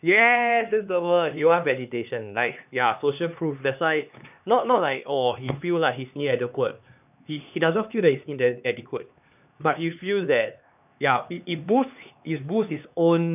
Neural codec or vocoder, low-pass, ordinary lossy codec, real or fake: codec, 16 kHz, 2 kbps, X-Codec, HuBERT features, trained on LibriSpeech; 3.6 kHz; none; fake